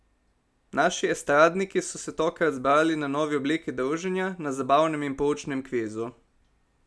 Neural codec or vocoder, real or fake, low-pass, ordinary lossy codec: none; real; none; none